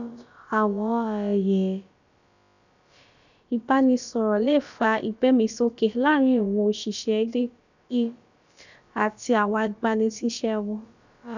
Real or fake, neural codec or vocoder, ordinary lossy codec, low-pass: fake; codec, 16 kHz, about 1 kbps, DyCAST, with the encoder's durations; none; 7.2 kHz